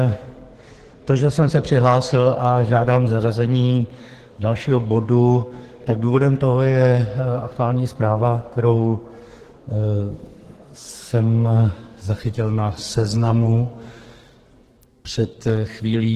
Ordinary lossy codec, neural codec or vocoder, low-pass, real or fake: Opus, 16 kbps; codec, 44.1 kHz, 2.6 kbps, SNAC; 14.4 kHz; fake